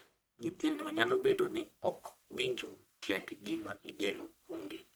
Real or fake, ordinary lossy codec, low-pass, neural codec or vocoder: fake; none; none; codec, 44.1 kHz, 1.7 kbps, Pupu-Codec